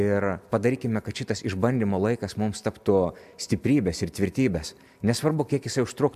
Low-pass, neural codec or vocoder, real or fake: 14.4 kHz; none; real